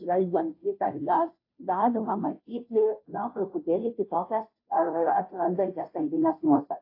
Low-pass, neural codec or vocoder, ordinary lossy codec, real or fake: 5.4 kHz; codec, 16 kHz, 0.5 kbps, FunCodec, trained on Chinese and English, 25 frames a second; AAC, 32 kbps; fake